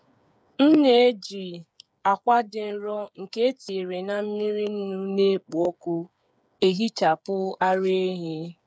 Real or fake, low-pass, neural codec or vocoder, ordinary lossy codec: fake; none; codec, 16 kHz, 8 kbps, FreqCodec, smaller model; none